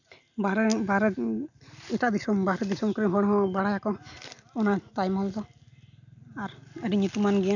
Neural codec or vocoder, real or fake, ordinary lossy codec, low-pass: none; real; none; 7.2 kHz